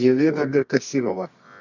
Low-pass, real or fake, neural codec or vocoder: 7.2 kHz; fake; codec, 24 kHz, 0.9 kbps, WavTokenizer, medium music audio release